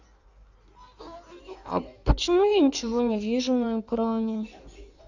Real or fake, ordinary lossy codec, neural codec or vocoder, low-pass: fake; none; codec, 16 kHz in and 24 kHz out, 1.1 kbps, FireRedTTS-2 codec; 7.2 kHz